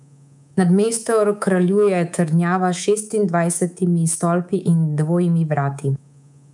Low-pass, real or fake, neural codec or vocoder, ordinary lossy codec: 10.8 kHz; fake; codec, 24 kHz, 3.1 kbps, DualCodec; none